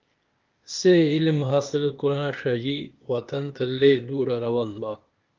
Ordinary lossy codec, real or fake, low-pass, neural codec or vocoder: Opus, 32 kbps; fake; 7.2 kHz; codec, 16 kHz, 0.8 kbps, ZipCodec